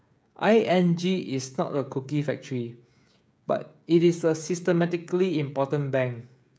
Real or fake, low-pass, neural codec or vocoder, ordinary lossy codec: fake; none; codec, 16 kHz, 16 kbps, FreqCodec, smaller model; none